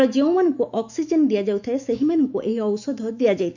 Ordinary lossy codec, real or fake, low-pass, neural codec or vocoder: none; fake; 7.2 kHz; autoencoder, 48 kHz, 128 numbers a frame, DAC-VAE, trained on Japanese speech